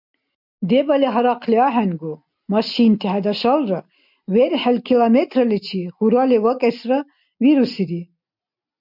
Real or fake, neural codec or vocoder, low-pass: real; none; 5.4 kHz